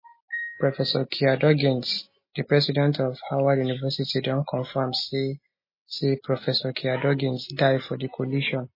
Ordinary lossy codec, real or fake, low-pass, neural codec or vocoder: MP3, 24 kbps; real; 5.4 kHz; none